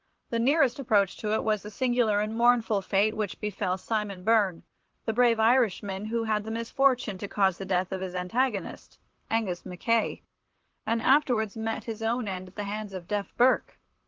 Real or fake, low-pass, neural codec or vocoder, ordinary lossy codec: fake; 7.2 kHz; vocoder, 44.1 kHz, 128 mel bands, Pupu-Vocoder; Opus, 16 kbps